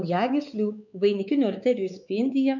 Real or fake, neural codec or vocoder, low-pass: fake; codec, 16 kHz, 4 kbps, X-Codec, WavLM features, trained on Multilingual LibriSpeech; 7.2 kHz